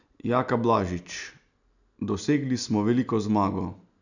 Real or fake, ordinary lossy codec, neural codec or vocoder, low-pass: real; none; none; 7.2 kHz